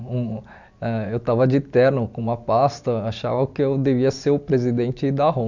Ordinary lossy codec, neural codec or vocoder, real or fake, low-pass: none; none; real; 7.2 kHz